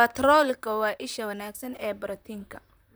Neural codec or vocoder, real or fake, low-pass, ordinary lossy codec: vocoder, 44.1 kHz, 128 mel bands, Pupu-Vocoder; fake; none; none